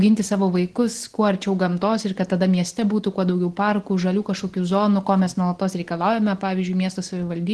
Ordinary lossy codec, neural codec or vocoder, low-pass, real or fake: Opus, 16 kbps; none; 10.8 kHz; real